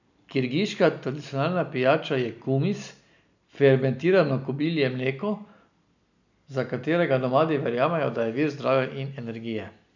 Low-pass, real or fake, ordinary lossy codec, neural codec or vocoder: 7.2 kHz; real; none; none